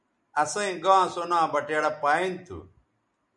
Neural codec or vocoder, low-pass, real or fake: none; 10.8 kHz; real